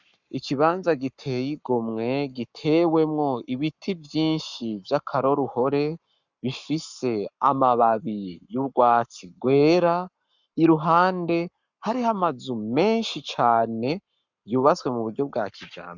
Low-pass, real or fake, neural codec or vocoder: 7.2 kHz; fake; codec, 44.1 kHz, 7.8 kbps, Pupu-Codec